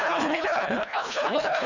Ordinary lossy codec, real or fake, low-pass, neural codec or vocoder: none; fake; 7.2 kHz; codec, 24 kHz, 1.5 kbps, HILCodec